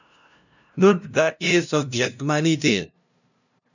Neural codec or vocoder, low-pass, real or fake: codec, 16 kHz, 0.5 kbps, FunCodec, trained on LibriTTS, 25 frames a second; 7.2 kHz; fake